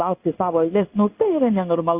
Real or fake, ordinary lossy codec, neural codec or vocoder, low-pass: fake; Opus, 64 kbps; codec, 16 kHz in and 24 kHz out, 1 kbps, XY-Tokenizer; 3.6 kHz